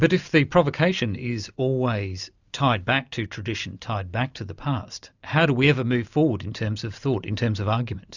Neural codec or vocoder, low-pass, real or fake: none; 7.2 kHz; real